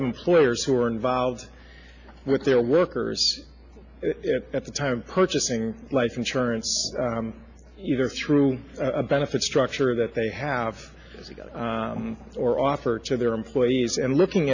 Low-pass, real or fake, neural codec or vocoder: 7.2 kHz; real; none